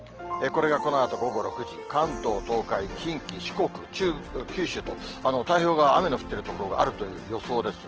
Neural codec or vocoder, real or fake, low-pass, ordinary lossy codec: none; real; 7.2 kHz; Opus, 16 kbps